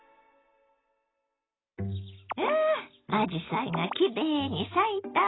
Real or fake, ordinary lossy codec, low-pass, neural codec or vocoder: real; AAC, 16 kbps; 7.2 kHz; none